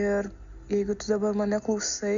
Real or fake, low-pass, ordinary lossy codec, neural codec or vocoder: real; 7.2 kHz; AAC, 64 kbps; none